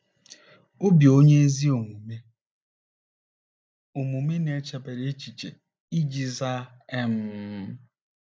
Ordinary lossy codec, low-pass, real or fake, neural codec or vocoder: none; none; real; none